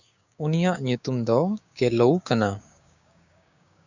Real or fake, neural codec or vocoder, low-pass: fake; codec, 16 kHz, 6 kbps, DAC; 7.2 kHz